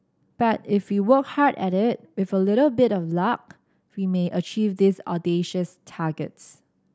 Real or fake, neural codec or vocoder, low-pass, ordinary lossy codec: real; none; none; none